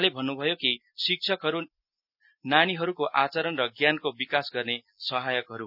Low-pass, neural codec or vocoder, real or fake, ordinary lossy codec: 5.4 kHz; none; real; none